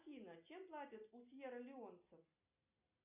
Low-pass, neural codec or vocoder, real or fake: 3.6 kHz; none; real